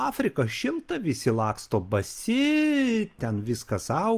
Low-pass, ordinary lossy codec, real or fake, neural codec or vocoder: 14.4 kHz; Opus, 24 kbps; real; none